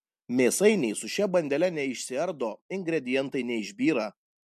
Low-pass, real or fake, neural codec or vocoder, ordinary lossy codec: 14.4 kHz; real; none; MP3, 64 kbps